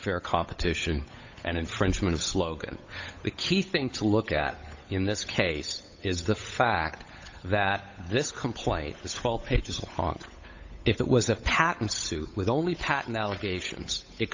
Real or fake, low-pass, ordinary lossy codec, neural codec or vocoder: fake; 7.2 kHz; AAC, 48 kbps; codec, 16 kHz, 8 kbps, FunCodec, trained on Chinese and English, 25 frames a second